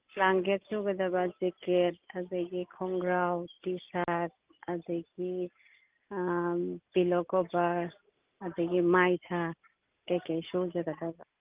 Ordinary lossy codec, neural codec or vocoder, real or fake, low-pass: Opus, 32 kbps; none; real; 3.6 kHz